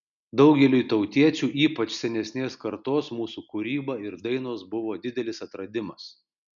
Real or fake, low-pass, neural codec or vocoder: real; 7.2 kHz; none